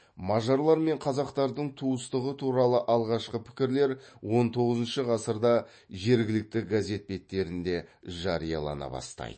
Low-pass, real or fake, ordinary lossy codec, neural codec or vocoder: 9.9 kHz; fake; MP3, 32 kbps; autoencoder, 48 kHz, 128 numbers a frame, DAC-VAE, trained on Japanese speech